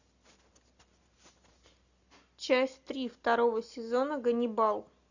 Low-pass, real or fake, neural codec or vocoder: 7.2 kHz; real; none